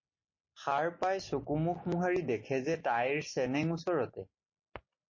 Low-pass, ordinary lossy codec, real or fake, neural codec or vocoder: 7.2 kHz; MP3, 32 kbps; real; none